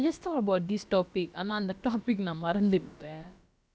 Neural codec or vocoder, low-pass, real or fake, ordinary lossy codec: codec, 16 kHz, about 1 kbps, DyCAST, with the encoder's durations; none; fake; none